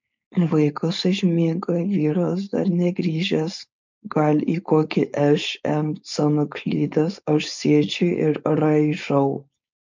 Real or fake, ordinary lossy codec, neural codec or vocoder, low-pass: fake; MP3, 64 kbps; codec, 16 kHz, 4.8 kbps, FACodec; 7.2 kHz